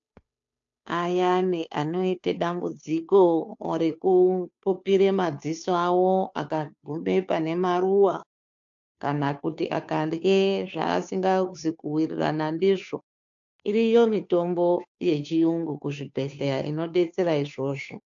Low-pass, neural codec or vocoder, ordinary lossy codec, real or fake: 7.2 kHz; codec, 16 kHz, 2 kbps, FunCodec, trained on Chinese and English, 25 frames a second; AAC, 64 kbps; fake